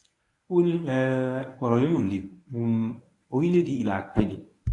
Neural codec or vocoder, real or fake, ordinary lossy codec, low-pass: codec, 24 kHz, 0.9 kbps, WavTokenizer, medium speech release version 1; fake; AAC, 48 kbps; 10.8 kHz